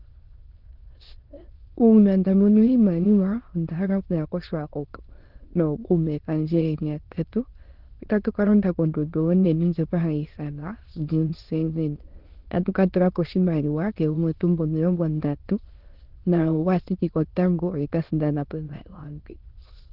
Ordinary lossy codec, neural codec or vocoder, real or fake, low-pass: Opus, 16 kbps; autoencoder, 22.05 kHz, a latent of 192 numbers a frame, VITS, trained on many speakers; fake; 5.4 kHz